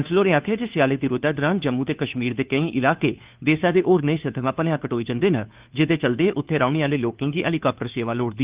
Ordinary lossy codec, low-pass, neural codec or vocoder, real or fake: Opus, 32 kbps; 3.6 kHz; codec, 16 kHz, 2 kbps, FunCodec, trained on Chinese and English, 25 frames a second; fake